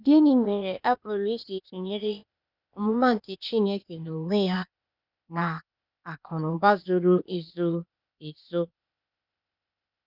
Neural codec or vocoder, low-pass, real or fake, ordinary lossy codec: codec, 16 kHz, 0.8 kbps, ZipCodec; 5.4 kHz; fake; none